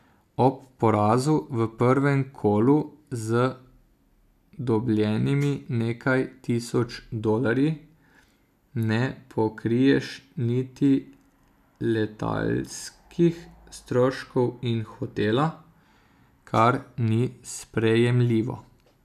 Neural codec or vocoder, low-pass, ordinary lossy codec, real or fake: none; 14.4 kHz; none; real